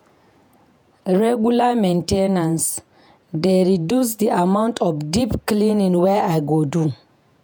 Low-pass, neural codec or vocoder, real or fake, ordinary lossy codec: none; vocoder, 48 kHz, 128 mel bands, Vocos; fake; none